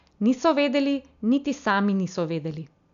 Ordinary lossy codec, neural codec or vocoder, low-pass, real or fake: none; none; 7.2 kHz; real